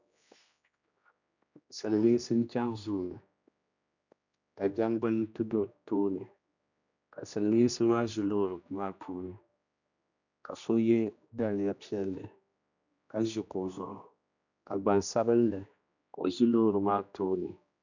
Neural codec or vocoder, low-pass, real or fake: codec, 16 kHz, 1 kbps, X-Codec, HuBERT features, trained on general audio; 7.2 kHz; fake